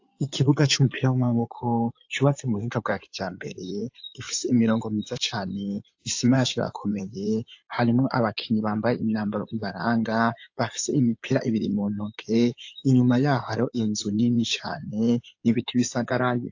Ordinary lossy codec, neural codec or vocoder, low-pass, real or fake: AAC, 48 kbps; codec, 16 kHz in and 24 kHz out, 2.2 kbps, FireRedTTS-2 codec; 7.2 kHz; fake